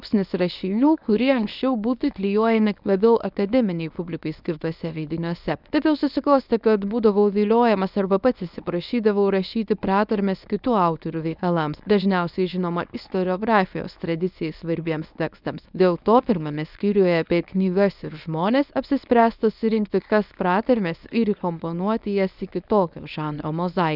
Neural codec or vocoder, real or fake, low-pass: codec, 24 kHz, 0.9 kbps, WavTokenizer, medium speech release version 1; fake; 5.4 kHz